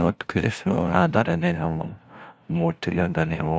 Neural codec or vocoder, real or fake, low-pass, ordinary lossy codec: codec, 16 kHz, 0.5 kbps, FunCodec, trained on LibriTTS, 25 frames a second; fake; none; none